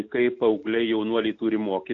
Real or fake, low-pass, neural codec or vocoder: real; 7.2 kHz; none